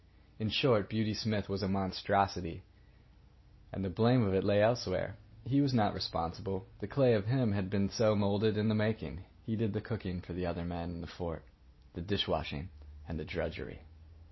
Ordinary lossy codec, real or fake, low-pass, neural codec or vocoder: MP3, 24 kbps; real; 7.2 kHz; none